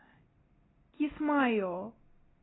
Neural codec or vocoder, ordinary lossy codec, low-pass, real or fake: none; AAC, 16 kbps; 7.2 kHz; real